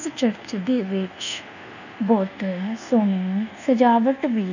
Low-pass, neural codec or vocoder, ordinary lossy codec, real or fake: 7.2 kHz; codec, 24 kHz, 1.2 kbps, DualCodec; none; fake